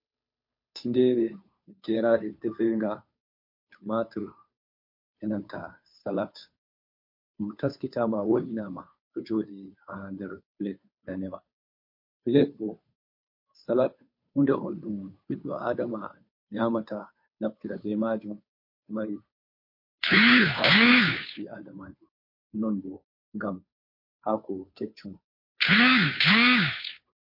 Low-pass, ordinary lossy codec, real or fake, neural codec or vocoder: 5.4 kHz; MP3, 32 kbps; fake; codec, 16 kHz, 2 kbps, FunCodec, trained on Chinese and English, 25 frames a second